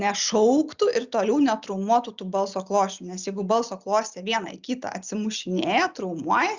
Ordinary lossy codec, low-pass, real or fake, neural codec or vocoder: Opus, 64 kbps; 7.2 kHz; real; none